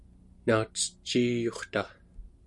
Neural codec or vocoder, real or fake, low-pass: none; real; 10.8 kHz